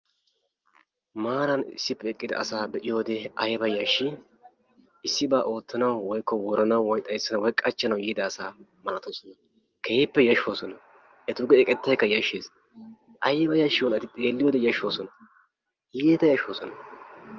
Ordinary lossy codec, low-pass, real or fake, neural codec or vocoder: Opus, 24 kbps; 7.2 kHz; real; none